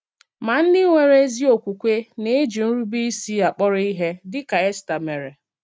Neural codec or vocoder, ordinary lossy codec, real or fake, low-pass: none; none; real; none